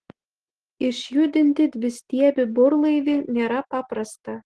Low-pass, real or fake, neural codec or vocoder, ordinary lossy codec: 10.8 kHz; real; none; Opus, 16 kbps